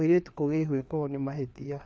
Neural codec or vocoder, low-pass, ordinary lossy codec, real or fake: codec, 16 kHz, 4 kbps, FreqCodec, larger model; none; none; fake